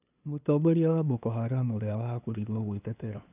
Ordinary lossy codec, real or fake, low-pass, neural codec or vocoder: none; fake; 3.6 kHz; codec, 16 kHz, 2 kbps, FunCodec, trained on LibriTTS, 25 frames a second